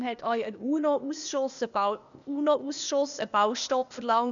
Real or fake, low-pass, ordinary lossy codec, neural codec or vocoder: fake; 7.2 kHz; none; codec, 16 kHz, 0.8 kbps, ZipCodec